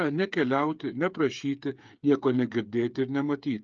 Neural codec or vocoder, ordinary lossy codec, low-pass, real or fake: codec, 16 kHz, 8 kbps, FreqCodec, smaller model; Opus, 24 kbps; 7.2 kHz; fake